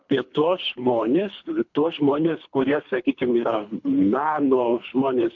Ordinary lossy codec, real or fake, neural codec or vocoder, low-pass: MP3, 64 kbps; fake; codec, 24 kHz, 3 kbps, HILCodec; 7.2 kHz